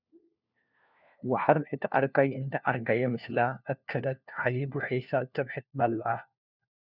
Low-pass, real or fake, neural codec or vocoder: 5.4 kHz; fake; codec, 16 kHz, 1 kbps, FunCodec, trained on LibriTTS, 50 frames a second